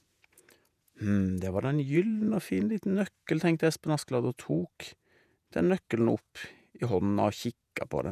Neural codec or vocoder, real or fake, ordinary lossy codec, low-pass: vocoder, 48 kHz, 128 mel bands, Vocos; fake; none; 14.4 kHz